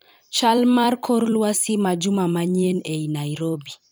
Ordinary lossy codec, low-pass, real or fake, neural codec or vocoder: none; none; fake; vocoder, 44.1 kHz, 128 mel bands every 256 samples, BigVGAN v2